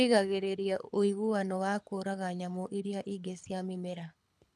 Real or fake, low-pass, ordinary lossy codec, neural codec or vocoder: fake; none; none; codec, 24 kHz, 6 kbps, HILCodec